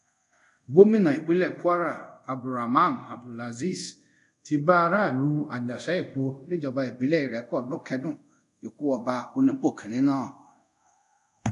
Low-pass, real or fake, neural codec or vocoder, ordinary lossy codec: 10.8 kHz; fake; codec, 24 kHz, 0.5 kbps, DualCodec; none